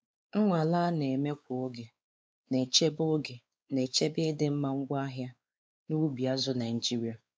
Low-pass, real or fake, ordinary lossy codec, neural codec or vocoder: none; fake; none; codec, 16 kHz, 4 kbps, X-Codec, WavLM features, trained on Multilingual LibriSpeech